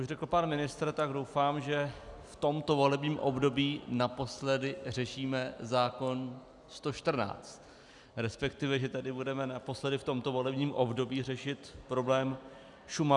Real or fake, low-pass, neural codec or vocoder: real; 10.8 kHz; none